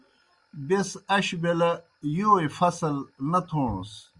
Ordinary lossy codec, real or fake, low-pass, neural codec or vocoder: Opus, 64 kbps; real; 10.8 kHz; none